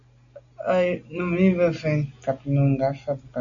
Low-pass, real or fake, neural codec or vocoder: 7.2 kHz; real; none